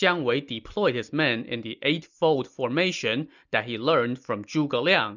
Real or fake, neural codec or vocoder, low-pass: real; none; 7.2 kHz